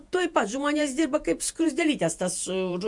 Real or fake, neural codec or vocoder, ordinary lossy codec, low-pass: fake; vocoder, 48 kHz, 128 mel bands, Vocos; MP3, 64 kbps; 10.8 kHz